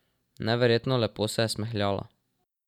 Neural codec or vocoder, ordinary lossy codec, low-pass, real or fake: none; none; 19.8 kHz; real